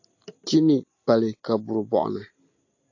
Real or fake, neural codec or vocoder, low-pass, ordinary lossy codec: real; none; 7.2 kHz; AAC, 48 kbps